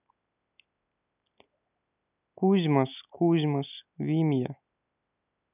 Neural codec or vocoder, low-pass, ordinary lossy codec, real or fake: none; 3.6 kHz; none; real